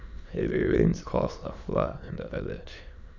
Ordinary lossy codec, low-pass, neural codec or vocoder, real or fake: none; 7.2 kHz; autoencoder, 22.05 kHz, a latent of 192 numbers a frame, VITS, trained on many speakers; fake